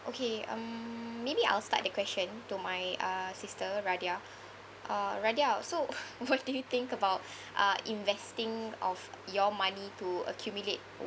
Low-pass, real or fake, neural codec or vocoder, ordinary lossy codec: none; real; none; none